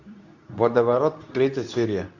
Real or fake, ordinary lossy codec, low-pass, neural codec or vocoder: fake; none; 7.2 kHz; codec, 24 kHz, 0.9 kbps, WavTokenizer, medium speech release version 2